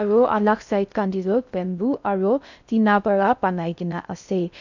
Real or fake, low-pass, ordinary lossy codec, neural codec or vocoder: fake; 7.2 kHz; none; codec, 16 kHz in and 24 kHz out, 0.6 kbps, FocalCodec, streaming, 4096 codes